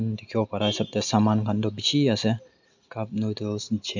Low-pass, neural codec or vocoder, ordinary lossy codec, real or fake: 7.2 kHz; none; none; real